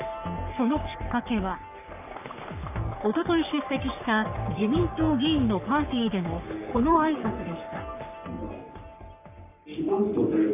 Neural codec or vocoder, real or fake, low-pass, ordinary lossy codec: codec, 44.1 kHz, 3.4 kbps, Pupu-Codec; fake; 3.6 kHz; none